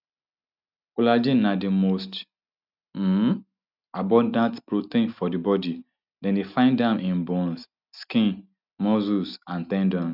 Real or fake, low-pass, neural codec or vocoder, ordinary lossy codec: real; 5.4 kHz; none; none